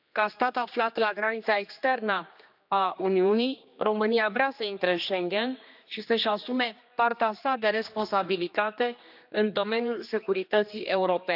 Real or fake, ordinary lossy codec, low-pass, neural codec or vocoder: fake; none; 5.4 kHz; codec, 16 kHz, 2 kbps, X-Codec, HuBERT features, trained on general audio